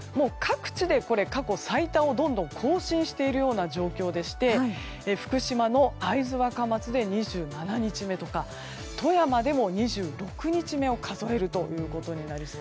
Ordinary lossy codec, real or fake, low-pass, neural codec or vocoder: none; real; none; none